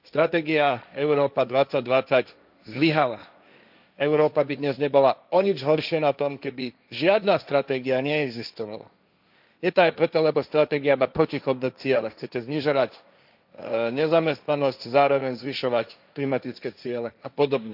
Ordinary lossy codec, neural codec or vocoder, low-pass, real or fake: none; codec, 16 kHz, 1.1 kbps, Voila-Tokenizer; 5.4 kHz; fake